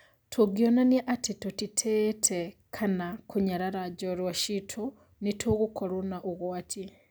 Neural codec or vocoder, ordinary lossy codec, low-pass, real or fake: none; none; none; real